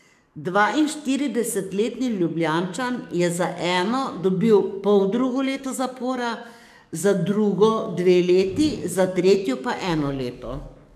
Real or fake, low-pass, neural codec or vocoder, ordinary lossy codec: fake; 14.4 kHz; codec, 44.1 kHz, 7.8 kbps, DAC; none